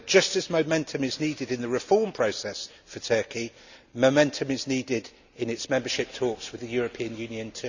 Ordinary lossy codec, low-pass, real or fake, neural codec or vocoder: none; 7.2 kHz; real; none